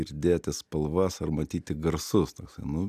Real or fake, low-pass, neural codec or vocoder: real; 14.4 kHz; none